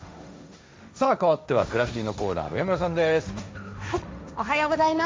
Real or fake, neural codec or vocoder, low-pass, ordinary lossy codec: fake; codec, 16 kHz, 1.1 kbps, Voila-Tokenizer; none; none